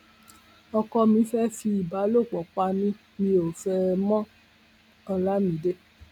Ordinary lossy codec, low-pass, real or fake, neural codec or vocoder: none; 19.8 kHz; real; none